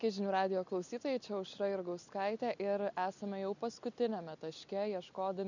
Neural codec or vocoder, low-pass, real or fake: none; 7.2 kHz; real